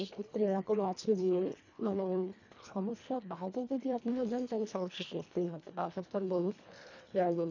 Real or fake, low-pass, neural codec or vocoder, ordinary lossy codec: fake; 7.2 kHz; codec, 24 kHz, 1.5 kbps, HILCodec; none